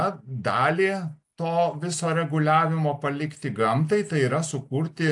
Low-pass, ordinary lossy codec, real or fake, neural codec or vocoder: 10.8 kHz; AAC, 64 kbps; real; none